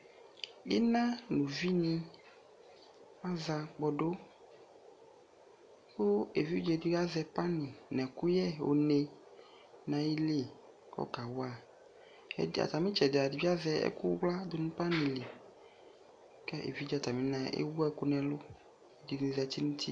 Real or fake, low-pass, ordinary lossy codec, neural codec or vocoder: real; 9.9 kHz; AAC, 64 kbps; none